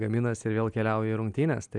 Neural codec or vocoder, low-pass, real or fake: none; 10.8 kHz; real